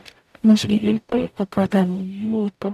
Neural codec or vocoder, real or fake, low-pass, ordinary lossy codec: codec, 44.1 kHz, 0.9 kbps, DAC; fake; 14.4 kHz; none